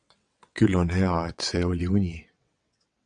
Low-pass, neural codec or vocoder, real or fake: 9.9 kHz; vocoder, 22.05 kHz, 80 mel bands, WaveNeXt; fake